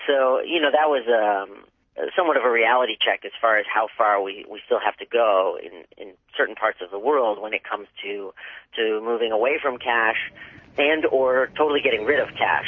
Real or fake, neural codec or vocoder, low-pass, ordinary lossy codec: real; none; 7.2 kHz; MP3, 32 kbps